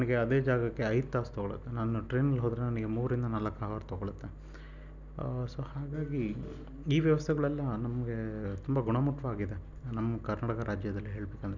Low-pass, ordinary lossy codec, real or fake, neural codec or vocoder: 7.2 kHz; none; real; none